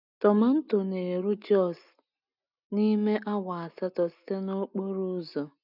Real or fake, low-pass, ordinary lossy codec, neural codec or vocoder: real; 5.4 kHz; none; none